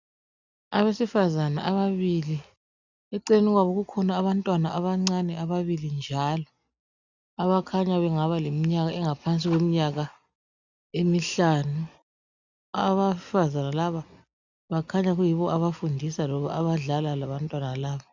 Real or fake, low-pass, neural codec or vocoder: real; 7.2 kHz; none